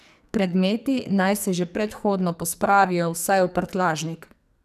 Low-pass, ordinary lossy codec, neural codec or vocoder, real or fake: 14.4 kHz; none; codec, 44.1 kHz, 2.6 kbps, SNAC; fake